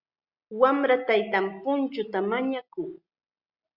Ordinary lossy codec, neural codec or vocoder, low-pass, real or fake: Opus, 64 kbps; none; 5.4 kHz; real